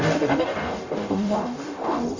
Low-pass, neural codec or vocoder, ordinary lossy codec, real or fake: 7.2 kHz; codec, 44.1 kHz, 0.9 kbps, DAC; none; fake